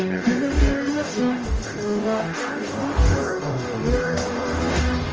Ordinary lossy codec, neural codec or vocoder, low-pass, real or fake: Opus, 24 kbps; codec, 44.1 kHz, 0.9 kbps, DAC; 7.2 kHz; fake